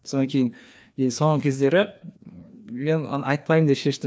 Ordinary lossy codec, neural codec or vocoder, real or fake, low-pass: none; codec, 16 kHz, 2 kbps, FreqCodec, larger model; fake; none